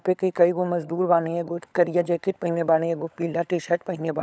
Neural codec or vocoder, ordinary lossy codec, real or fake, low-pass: codec, 16 kHz, 16 kbps, FunCodec, trained on LibriTTS, 50 frames a second; none; fake; none